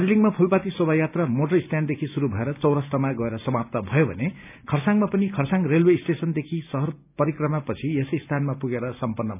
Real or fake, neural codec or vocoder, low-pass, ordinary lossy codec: real; none; 3.6 kHz; none